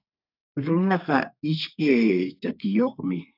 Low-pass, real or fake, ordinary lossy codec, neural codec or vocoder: 5.4 kHz; fake; AAC, 48 kbps; codec, 32 kHz, 1.9 kbps, SNAC